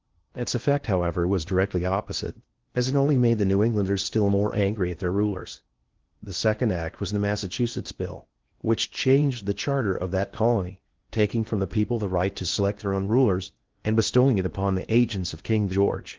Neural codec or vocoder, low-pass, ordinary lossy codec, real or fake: codec, 16 kHz in and 24 kHz out, 0.8 kbps, FocalCodec, streaming, 65536 codes; 7.2 kHz; Opus, 16 kbps; fake